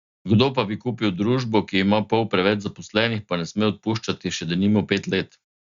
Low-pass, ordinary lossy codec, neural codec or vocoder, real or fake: 7.2 kHz; Opus, 64 kbps; none; real